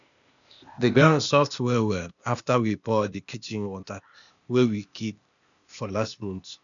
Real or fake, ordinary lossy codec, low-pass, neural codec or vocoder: fake; none; 7.2 kHz; codec, 16 kHz, 0.8 kbps, ZipCodec